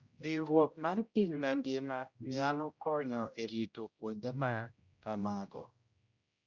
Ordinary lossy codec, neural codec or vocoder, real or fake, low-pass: Opus, 64 kbps; codec, 16 kHz, 0.5 kbps, X-Codec, HuBERT features, trained on general audio; fake; 7.2 kHz